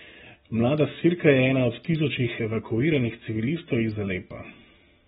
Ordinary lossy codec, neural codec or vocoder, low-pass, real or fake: AAC, 16 kbps; none; 19.8 kHz; real